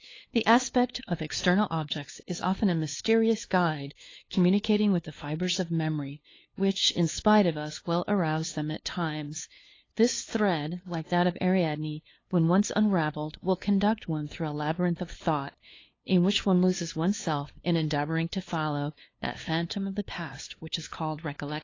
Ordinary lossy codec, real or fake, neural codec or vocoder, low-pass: AAC, 32 kbps; fake; codec, 16 kHz, 2 kbps, FunCodec, trained on LibriTTS, 25 frames a second; 7.2 kHz